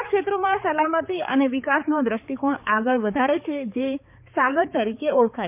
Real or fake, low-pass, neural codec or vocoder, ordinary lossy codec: fake; 3.6 kHz; codec, 16 kHz, 4 kbps, X-Codec, HuBERT features, trained on balanced general audio; none